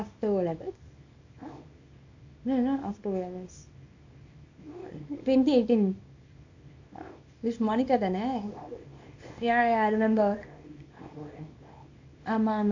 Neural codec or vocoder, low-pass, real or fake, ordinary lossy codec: codec, 24 kHz, 0.9 kbps, WavTokenizer, small release; 7.2 kHz; fake; AAC, 48 kbps